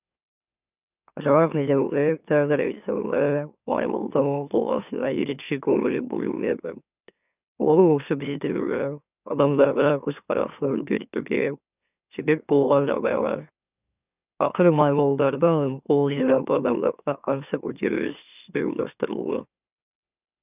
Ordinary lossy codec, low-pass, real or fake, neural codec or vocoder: none; 3.6 kHz; fake; autoencoder, 44.1 kHz, a latent of 192 numbers a frame, MeloTTS